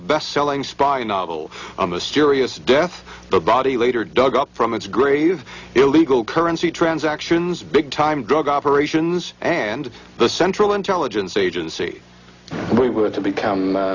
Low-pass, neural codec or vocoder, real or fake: 7.2 kHz; none; real